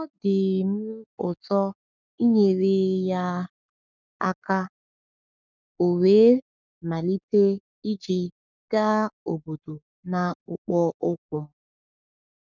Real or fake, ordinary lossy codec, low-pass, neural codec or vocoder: fake; none; 7.2 kHz; codec, 44.1 kHz, 7.8 kbps, Pupu-Codec